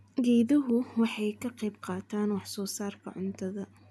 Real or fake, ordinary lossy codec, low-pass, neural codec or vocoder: real; none; none; none